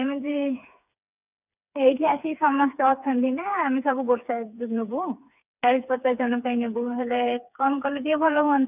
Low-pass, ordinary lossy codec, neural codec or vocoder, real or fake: 3.6 kHz; none; codec, 16 kHz, 4 kbps, FreqCodec, smaller model; fake